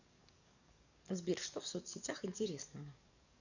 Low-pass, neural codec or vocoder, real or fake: 7.2 kHz; codec, 44.1 kHz, 7.8 kbps, DAC; fake